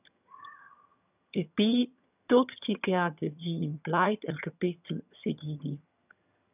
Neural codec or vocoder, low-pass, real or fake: vocoder, 22.05 kHz, 80 mel bands, HiFi-GAN; 3.6 kHz; fake